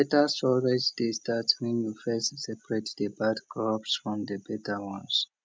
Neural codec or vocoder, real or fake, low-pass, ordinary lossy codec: none; real; none; none